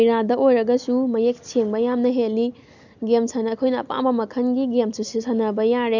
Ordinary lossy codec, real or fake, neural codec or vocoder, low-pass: none; real; none; 7.2 kHz